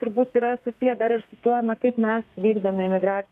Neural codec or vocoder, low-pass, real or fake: codec, 32 kHz, 1.9 kbps, SNAC; 14.4 kHz; fake